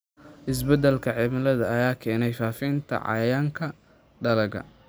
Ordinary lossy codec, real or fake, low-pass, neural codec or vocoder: none; real; none; none